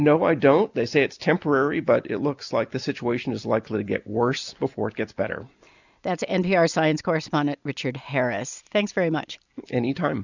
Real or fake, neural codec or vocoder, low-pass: real; none; 7.2 kHz